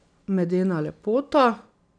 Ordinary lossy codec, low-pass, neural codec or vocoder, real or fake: none; 9.9 kHz; none; real